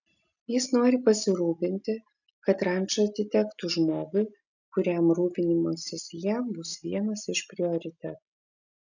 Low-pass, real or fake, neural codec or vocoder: 7.2 kHz; real; none